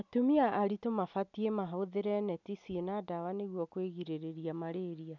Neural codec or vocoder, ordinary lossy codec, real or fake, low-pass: none; none; real; 7.2 kHz